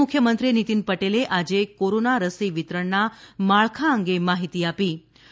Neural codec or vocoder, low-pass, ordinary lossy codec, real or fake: none; none; none; real